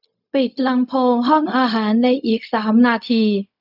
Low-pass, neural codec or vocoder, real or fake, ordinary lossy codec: 5.4 kHz; codec, 16 kHz, 0.4 kbps, LongCat-Audio-Codec; fake; none